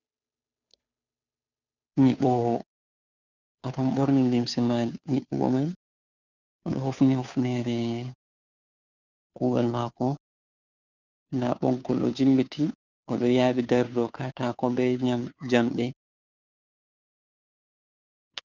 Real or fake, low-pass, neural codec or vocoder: fake; 7.2 kHz; codec, 16 kHz, 2 kbps, FunCodec, trained on Chinese and English, 25 frames a second